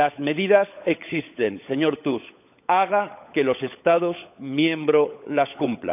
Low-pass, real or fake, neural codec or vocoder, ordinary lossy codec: 3.6 kHz; fake; codec, 16 kHz, 16 kbps, FunCodec, trained on LibriTTS, 50 frames a second; none